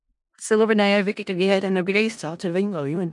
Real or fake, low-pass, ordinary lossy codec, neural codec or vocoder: fake; 10.8 kHz; MP3, 96 kbps; codec, 16 kHz in and 24 kHz out, 0.4 kbps, LongCat-Audio-Codec, four codebook decoder